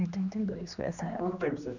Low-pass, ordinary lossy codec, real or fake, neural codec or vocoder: 7.2 kHz; none; fake; codec, 16 kHz, 2 kbps, X-Codec, HuBERT features, trained on general audio